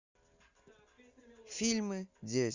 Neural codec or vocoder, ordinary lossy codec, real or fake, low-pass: none; Opus, 64 kbps; real; 7.2 kHz